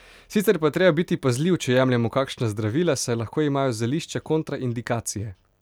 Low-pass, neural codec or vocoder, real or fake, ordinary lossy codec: 19.8 kHz; none; real; none